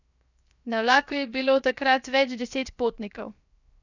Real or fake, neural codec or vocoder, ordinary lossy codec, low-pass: fake; codec, 16 kHz, 0.7 kbps, FocalCodec; none; 7.2 kHz